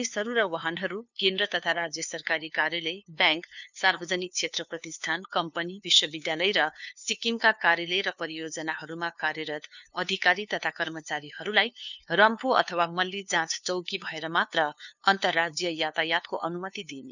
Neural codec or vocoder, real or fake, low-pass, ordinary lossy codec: codec, 16 kHz, 2 kbps, FunCodec, trained on LibriTTS, 25 frames a second; fake; 7.2 kHz; none